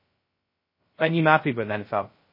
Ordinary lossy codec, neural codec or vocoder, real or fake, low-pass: MP3, 24 kbps; codec, 16 kHz, 0.2 kbps, FocalCodec; fake; 5.4 kHz